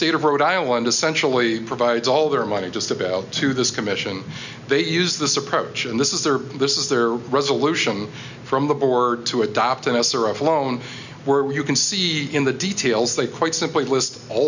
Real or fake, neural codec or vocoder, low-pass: real; none; 7.2 kHz